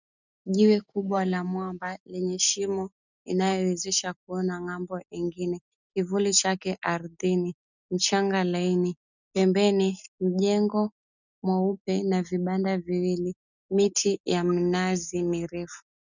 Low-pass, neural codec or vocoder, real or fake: 7.2 kHz; none; real